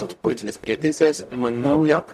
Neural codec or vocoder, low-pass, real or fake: codec, 44.1 kHz, 0.9 kbps, DAC; 14.4 kHz; fake